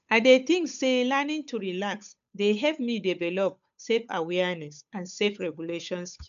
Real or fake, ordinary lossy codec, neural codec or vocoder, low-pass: fake; none; codec, 16 kHz, 8 kbps, FunCodec, trained on Chinese and English, 25 frames a second; 7.2 kHz